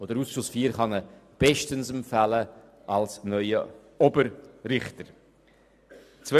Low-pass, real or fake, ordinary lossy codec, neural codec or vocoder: 14.4 kHz; real; none; none